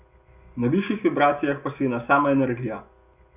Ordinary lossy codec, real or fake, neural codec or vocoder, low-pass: none; real; none; 3.6 kHz